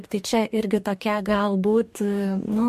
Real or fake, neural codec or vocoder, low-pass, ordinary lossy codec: fake; codec, 44.1 kHz, 2.6 kbps, DAC; 14.4 kHz; MP3, 64 kbps